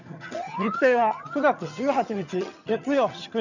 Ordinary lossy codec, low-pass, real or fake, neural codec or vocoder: none; 7.2 kHz; fake; vocoder, 22.05 kHz, 80 mel bands, HiFi-GAN